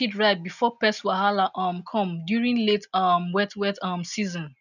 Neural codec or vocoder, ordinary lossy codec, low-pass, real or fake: none; none; 7.2 kHz; real